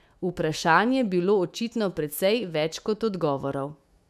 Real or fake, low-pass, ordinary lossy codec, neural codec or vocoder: fake; 14.4 kHz; none; autoencoder, 48 kHz, 128 numbers a frame, DAC-VAE, trained on Japanese speech